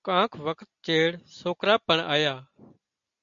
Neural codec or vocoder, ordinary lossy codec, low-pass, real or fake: none; MP3, 64 kbps; 7.2 kHz; real